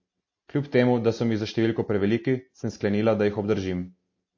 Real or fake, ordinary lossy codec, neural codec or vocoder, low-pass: real; MP3, 32 kbps; none; 7.2 kHz